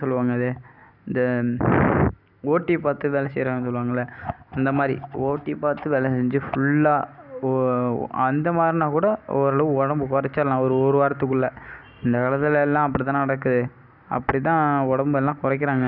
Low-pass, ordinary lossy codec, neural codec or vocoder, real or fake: 5.4 kHz; none; none; real